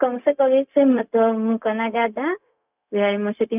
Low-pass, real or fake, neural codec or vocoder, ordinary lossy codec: 3.6 kHz; fake; codec, 16 kHz, 0.4 kbps, LongCat-Audio-Codec; none